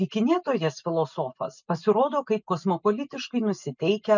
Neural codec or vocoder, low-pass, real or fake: none; 7.2 kHz; real